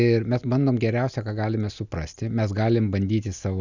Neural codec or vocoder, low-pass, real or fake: none; 7.2 kHz; real